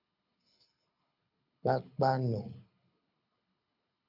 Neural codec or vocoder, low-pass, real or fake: codec, 24 kHz, 6 kbps, HILCodec; 5.4 kHz; fake